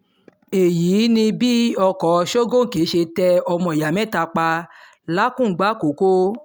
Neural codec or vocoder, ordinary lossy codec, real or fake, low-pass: none; none; real; none